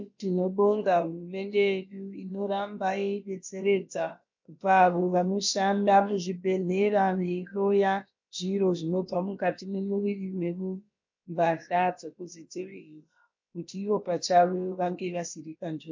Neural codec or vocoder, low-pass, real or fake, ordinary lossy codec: codec, 16 kHz, about 1 kbps, DyCAST, with the encoder's durations; 7.2 kHz; fake; MP3, 48 kbps